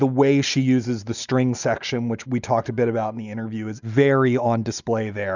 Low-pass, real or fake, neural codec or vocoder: 7.2 kHz; real; none